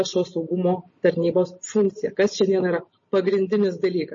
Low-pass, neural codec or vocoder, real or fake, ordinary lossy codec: 7.2 kHz; none; real; MP3, 32 kbps